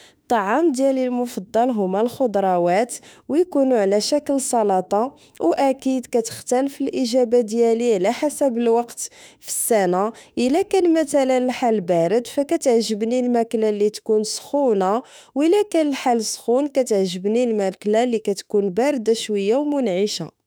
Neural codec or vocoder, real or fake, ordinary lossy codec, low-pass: autoencoder, 48 kHz, 32 numbers a frame, DAC-VAE, trained on Japanese speech; fake; none; none